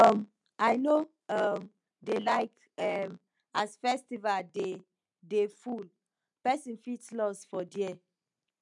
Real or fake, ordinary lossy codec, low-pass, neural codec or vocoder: real; none; 10.8 kHz; none